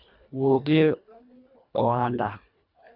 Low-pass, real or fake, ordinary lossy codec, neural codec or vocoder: 5.4 kHz; fake; none; codec, 24 kHz, 1.5 kbps, HILCodec